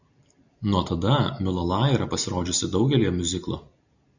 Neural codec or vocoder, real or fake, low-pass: none; real; 7.2 kHz